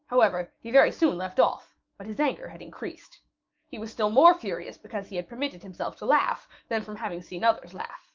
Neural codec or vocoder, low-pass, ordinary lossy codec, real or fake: autoencoder, 48 kHz, 128 numbers a frame, DAC-VAE, trained on Japanese speech; 7.2 kHz; Opus, 24 kbps; fake